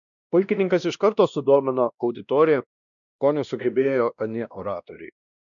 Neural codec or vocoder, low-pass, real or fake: codec, 16 kHz, 1 kbps, X-Codec, WavLM features, trained on Multilingual LibriSpeech; 7.2 kHz; fake